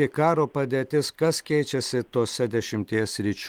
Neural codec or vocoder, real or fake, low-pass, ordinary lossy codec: none; real; 19.8 kHz; Opus, 24 kbps